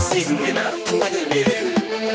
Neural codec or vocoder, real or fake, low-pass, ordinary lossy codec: codec, 16 kHz, 2 kbps, X-Codec, HuBERT features, trained on general audio; fake; none; none